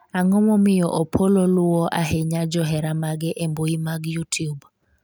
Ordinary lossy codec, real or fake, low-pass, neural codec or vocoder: none; real; none; none